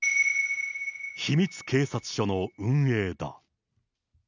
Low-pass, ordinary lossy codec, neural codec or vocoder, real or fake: 7.2 kHz; none; none; real